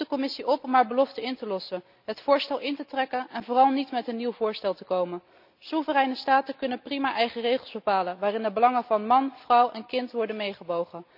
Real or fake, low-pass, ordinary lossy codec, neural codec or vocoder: real; 5.4 kHz; none; none